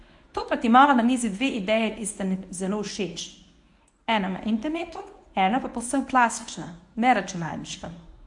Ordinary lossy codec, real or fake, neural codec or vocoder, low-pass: AAC, 64 kbps; fake; codec, 24 kHz, 0.9 kbps, WavTokenizer, medium speech release version 1; 10.8 kHz